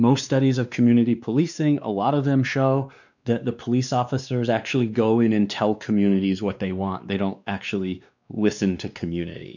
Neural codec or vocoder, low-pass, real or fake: codec, 16 kHz, 2 kbps, X-Codec, WavLM features, trained on Multilingual LibriSpeech; 7.2 kHz; fake